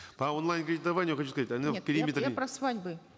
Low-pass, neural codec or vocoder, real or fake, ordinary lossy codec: none; none; real; none